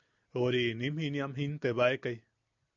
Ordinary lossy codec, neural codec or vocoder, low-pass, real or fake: AAC, 64 kbps; none; 7.2 kHz; real